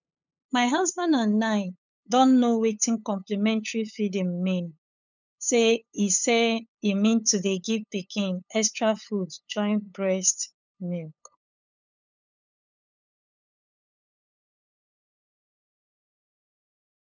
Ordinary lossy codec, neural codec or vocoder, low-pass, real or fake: none; codec, 16 kHz, 8 kbps, FunCodec, trained on LibriTTS, 25 frames a second; 7.2 kHz; fake